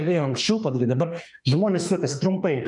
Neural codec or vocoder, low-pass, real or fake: autoencoder, 48 kHz, 32 numbers a frame, DAC-VAE, trained on Japanese speech; 10.8 kHz; fake